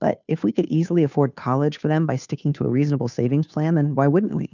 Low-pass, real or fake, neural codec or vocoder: 7.2 kHz; fake; codec, 16 kHz, 2 kbps, FunCodec, trained on Chinese and English, 25 frames a second